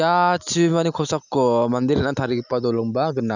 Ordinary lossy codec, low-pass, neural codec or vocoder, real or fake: none; 7.2 kHz; none; real